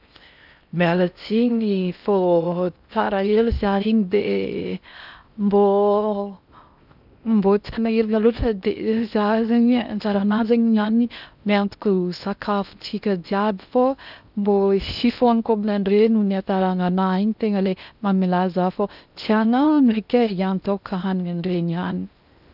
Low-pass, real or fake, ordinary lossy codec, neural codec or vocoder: 5.4 kHz; fake; none; codec, 16 kHz in and 24 kHz out, 0.6 kbps, FocalCodec, streaming, 4096 codes